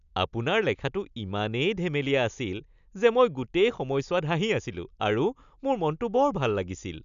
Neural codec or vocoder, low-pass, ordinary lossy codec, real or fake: none; 7.2 kHz; none; real